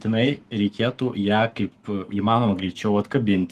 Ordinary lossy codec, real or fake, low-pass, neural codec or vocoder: Opus, 24 kbps; fake; 14.4 kHz; codec, 44.1 kHz, 7.8 kbps, Pupu-Codec